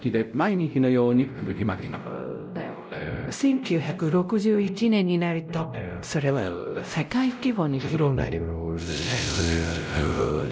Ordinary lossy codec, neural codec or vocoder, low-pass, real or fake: none; codec, 16 kHz, 0.5 kbps, X-Codec, WavLM features, trained on Multilingual LibriSpeech; none; fake